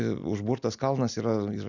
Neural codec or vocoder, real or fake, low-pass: none; real; 7.2 kHz